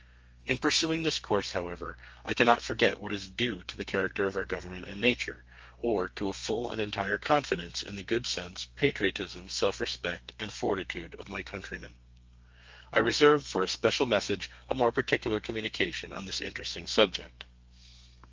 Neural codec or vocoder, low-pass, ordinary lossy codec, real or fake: codec, 32 kHz, 1.9 kbps, SNAC; 7.2 kHz; Opus, 24 kbps; fake